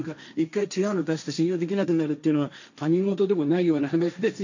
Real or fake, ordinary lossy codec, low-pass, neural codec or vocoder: fake; none; 7.2 kHz; codec, 16 kHz, 1.1 kbps, Voila-Tokenizer